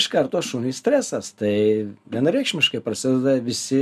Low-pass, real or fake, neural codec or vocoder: 14.4 kHz; real; none